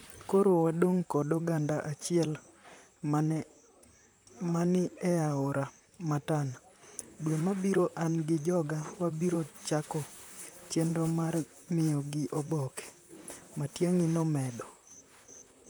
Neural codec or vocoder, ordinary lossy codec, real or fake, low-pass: vocoder, 44.1 kHz, 128 mel bands, Pupu-Vocoder; none; fake; none